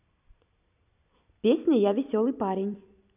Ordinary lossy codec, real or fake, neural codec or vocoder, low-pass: none; fake; vocoder, 44.1 kHz, 128 mel bands every 256 samples, BigVGAN v2; 3.6 kHz